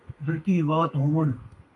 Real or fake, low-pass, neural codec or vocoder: fake; 10.8 kHz; codec, 32 kHz, 1.9 kbps, SNAC